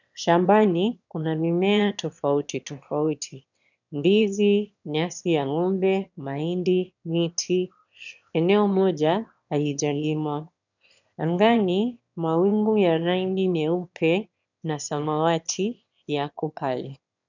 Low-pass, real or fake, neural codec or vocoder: 7.2 kHz; fake; autoencoder, 22.05 kHz, a latent of 192 numbers a frame, VITS, trained on one speaker